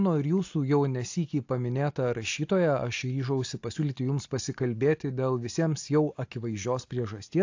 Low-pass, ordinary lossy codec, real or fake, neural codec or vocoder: 7.2 kHz; AAC, 48 kbps; real; none